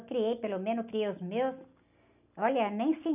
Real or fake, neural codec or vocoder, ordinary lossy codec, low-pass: real; none; none; 3.6 kHz